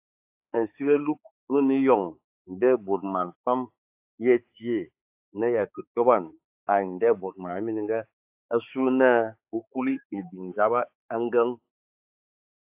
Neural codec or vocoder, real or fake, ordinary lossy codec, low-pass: codec, 16 kHz, 4 kbps, X-Codec, HuBERT features, trained on balanced general audio; fake; AAC, 32 kbps; 3.6 kHz